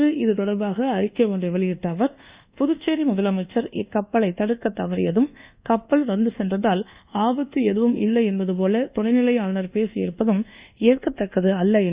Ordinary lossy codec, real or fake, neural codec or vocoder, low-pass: Opus, 64 kbps; fake; codec, 24 kHz, 1.2 kbps, DualCodec; 3.6 kHz